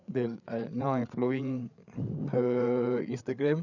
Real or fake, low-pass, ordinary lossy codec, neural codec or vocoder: fake; 7.2 kHz; none; codec, 16 kHz, 8 kbps, FreqCodec, larger model